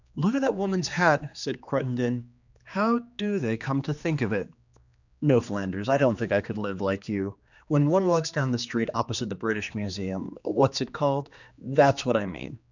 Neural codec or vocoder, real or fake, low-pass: codec, 16 kHz, 2 kbps, X-Codec, HuBERT features, trained on general audio; fake; 7.2 kHz